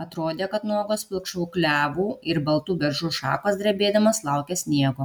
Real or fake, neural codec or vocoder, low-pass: real; none; 19.8 kHz